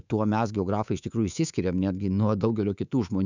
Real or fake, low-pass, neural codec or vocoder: fake; 7.2 kHz; codec, 24 kHz, 3.1 kbps, DualCodec